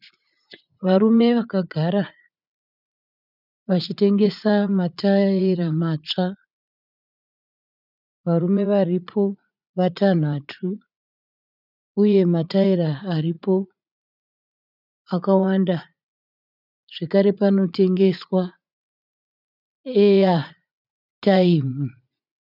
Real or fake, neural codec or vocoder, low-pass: fake; vocoder, 44.1 kHz, 80 mel bands, Vocos; 5.4 kHz